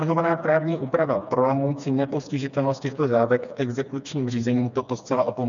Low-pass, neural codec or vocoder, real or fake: 7.2 kHz; codec, 16 kHz, 2 kbps, FreqCodec, smaller model; fake